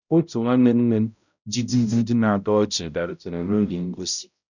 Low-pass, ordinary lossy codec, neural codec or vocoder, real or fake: 7.2 kHz; none; codec, 16 kHz, 0.5 kbps, X-Codec, HuBERT features, trained on balanced general audio; fake